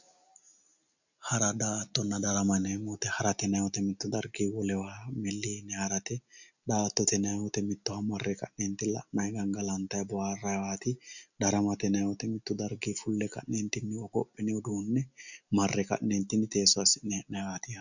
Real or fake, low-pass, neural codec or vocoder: real; 7.2 kHz; none